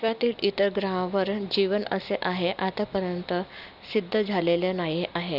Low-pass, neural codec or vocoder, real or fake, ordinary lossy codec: 5.4 kHz; none; real; none